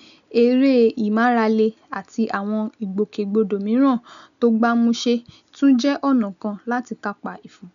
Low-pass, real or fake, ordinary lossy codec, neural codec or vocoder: 7.2 kHz; real; none; none